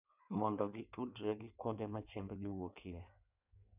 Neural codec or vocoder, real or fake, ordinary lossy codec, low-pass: codec, 16 kHz in and 24 kHz out, 1.1 kbps, FireRedTTS-2 codec; fake; none; 3.6 kHz